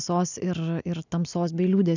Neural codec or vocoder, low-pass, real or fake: none; 7.2 kHz; real